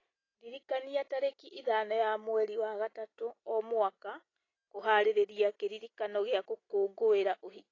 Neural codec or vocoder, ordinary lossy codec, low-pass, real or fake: none; AAC, 32 kbps; 7.2 kHz; real